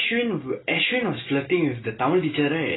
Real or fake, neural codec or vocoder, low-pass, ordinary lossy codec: real; none; 7.2 kHz; AAC, 16 kbps